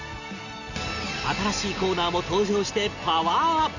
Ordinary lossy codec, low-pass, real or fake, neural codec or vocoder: none; 7.2 kHz; real; none